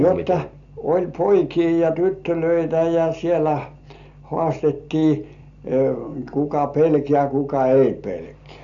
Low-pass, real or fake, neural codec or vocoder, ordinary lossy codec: 7.2 kHz; real; none; MP3, 96 kbps